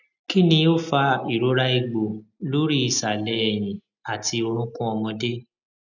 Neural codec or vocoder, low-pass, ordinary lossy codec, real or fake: none; 7.2 kHz; none; real